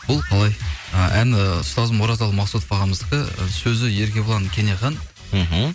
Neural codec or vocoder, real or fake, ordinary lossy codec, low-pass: none; real; none; none